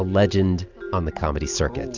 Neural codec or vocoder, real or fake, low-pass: none; real; 7.2 kHz